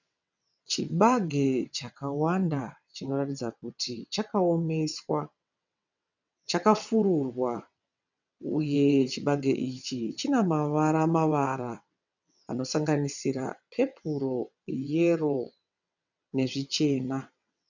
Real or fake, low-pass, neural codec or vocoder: fake; 7.2 kHz; vocoder, 22.05 kHz, 80 mel bands, WaveNeXt